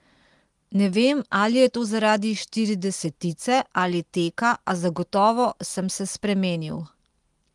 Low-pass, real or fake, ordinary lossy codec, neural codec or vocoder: 10.8 kHz; real; Opus, 24 kbps; none